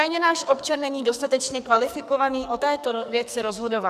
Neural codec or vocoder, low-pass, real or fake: codec, 44.1 kHz, 2.6 kbps, SNAC; 14.4 kHz; fake